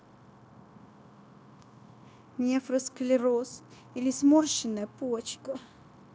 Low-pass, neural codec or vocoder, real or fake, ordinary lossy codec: none; codec, 16 kHz, 0.9 kbps, LongCat-Audio-Codec; fake; none